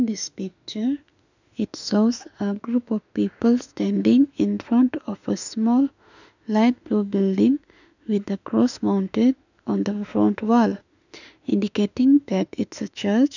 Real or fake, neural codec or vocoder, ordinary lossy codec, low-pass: fake; autoencoder, 48 kHz, 32 numbers a frame, DAC-VAE, trained on Japanese speech; none; 7.2 kHz